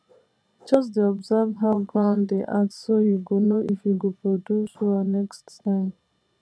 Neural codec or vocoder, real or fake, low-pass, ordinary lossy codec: vocoder, 22.05 kHz, 80 mel bands, Vocos; fake; none; none